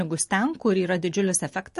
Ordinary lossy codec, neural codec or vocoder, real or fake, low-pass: MP3, 48 kbps; none; real; 14.4 kHz